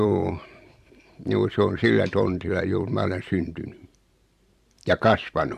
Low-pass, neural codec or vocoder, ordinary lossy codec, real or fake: 14.4 kHz; vocoder, 44.1 kHz, 128 mel bands every 512 samples, BigVGAN v2; Opus, 64 kbps; fake